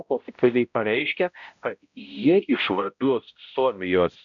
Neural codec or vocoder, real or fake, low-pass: codec, 16 kHz, 0.5 kbps, X-Codec, HuBERT features, trained on balanced general audio; fake; 7.2 kHz